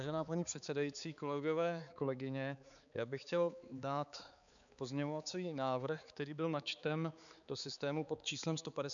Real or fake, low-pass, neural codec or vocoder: fake; 7.2 kHz; codec, 16 kHz, 4 kbps, X-Codec, HuBERT features, trained on balanced general audio